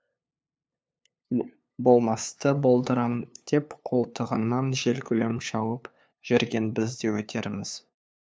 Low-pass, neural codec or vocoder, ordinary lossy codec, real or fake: none; codec, 16 kHz, 2 kbps, FunCodec, trained on LibriTTS, 25 frames a second; none; fake